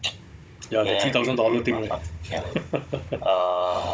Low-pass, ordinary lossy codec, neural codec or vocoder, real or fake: none; none; codec, 16 kHz, 16 kbps, FunCodec, trained on Chinese and English, 50 frames a second; fake